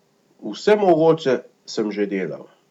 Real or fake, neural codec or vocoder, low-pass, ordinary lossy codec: real; none; 19.8 kHz; none